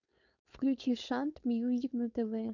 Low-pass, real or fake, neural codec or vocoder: 7.2 kHz; fake; codec, 16 kHz, 4.8 kbps, FACodec